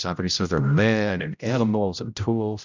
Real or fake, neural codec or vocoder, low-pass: fake; codec, 16 kHz, 0.5 kbps, X-Codec, HuBERT features, trained on general audio; 7.2 kHz